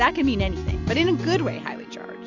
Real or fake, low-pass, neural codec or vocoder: real; 7.2 kHz; none